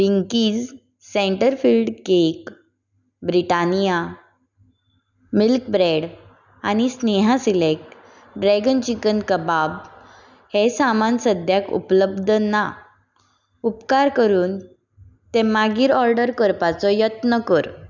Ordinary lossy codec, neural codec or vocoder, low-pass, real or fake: none; none; 7.2 kHz; real